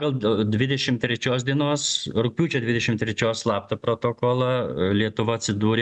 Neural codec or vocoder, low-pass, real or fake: none; 10.8 kHz; real